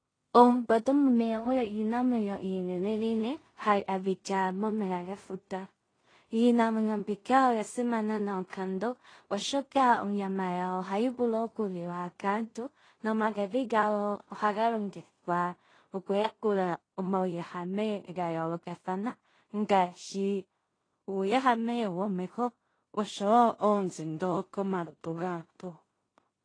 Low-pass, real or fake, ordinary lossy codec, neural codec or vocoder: 9.9 kHz; fake; AAC, 32 kbps; codec, 16 kHz in and 24 kHz out, 0.4 kbps, LongCat-Audio-Codec, two codebook decoder